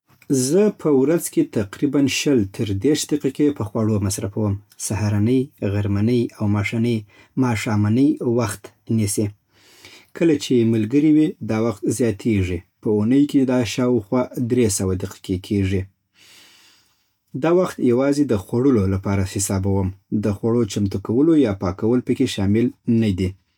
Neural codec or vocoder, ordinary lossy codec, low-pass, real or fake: none; none; 19.8 kHz; real